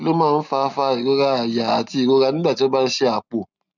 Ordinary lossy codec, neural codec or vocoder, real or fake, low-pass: none; none; real; 7.2 kHz